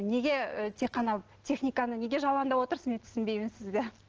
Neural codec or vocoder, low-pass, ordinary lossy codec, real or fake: none; 7.2 kHz; Opus, 16 kbps; real